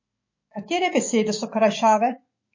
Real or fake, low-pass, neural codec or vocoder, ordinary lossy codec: fake; 7.2 kHz; autoencoder, 48 kHz, 128 numbers a frame, DAC-VAE, trained on Japanese speech; MP3, 32 kbps